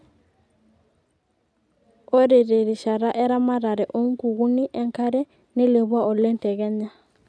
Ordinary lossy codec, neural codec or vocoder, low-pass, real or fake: none; none; none; real